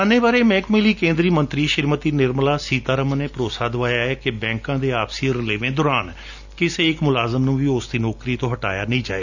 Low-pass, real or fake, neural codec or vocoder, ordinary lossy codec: 7.2 kHz; real; none; none